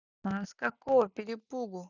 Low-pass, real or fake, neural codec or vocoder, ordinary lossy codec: 7.2 kHz; real; none; none